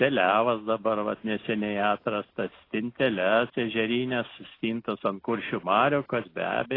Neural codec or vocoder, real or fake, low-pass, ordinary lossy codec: none; real; 5.4 kHz; AAC, 24 kbps